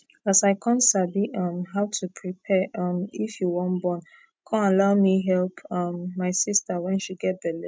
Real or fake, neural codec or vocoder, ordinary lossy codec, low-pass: real; none; none; none